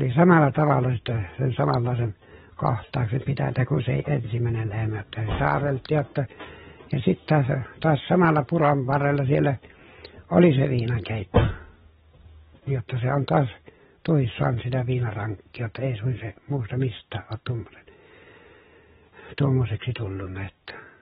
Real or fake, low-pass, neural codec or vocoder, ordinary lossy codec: real; 19.8 kHz; none; AAC, 16 kbps